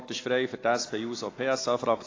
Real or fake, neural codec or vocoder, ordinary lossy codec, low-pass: real; none; AAC, 32 kbps; 7.2 kHz